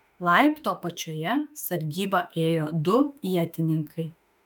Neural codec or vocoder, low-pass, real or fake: autoencoder, 48 kHz, 32 numbers a frame, DAC-VAE, trained on Japanese speech; 19.8 kHz; fake